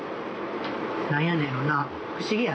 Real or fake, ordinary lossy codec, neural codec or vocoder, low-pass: real; none; none; none